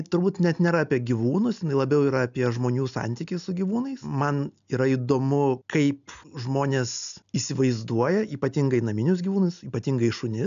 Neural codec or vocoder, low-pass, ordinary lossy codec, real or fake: none; 7.2 kHz; AAC, 96 kbps; real